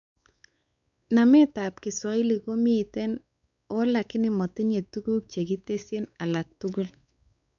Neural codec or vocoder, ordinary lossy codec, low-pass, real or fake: codec, 16 kHz, 4 kbps, X-Codec, WavLM features, trained on Multilingual LibriSpeech; none; 7.2 kHz; fake